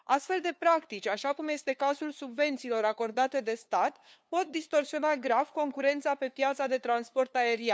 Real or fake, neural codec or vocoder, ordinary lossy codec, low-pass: fake; codec, 16 kHz, 2 kbps, FunCodec, trained on LibriTTS, 25 frames a second; none; none